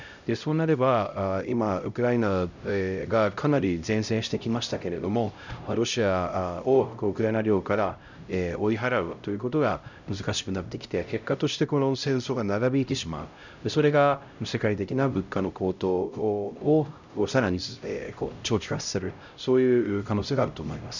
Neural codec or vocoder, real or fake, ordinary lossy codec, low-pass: codec, 16 kHz, 0.5 kbps, X-Codec, HuBERT features, trained on LibriSpeech; fake; none; 7.2 kHz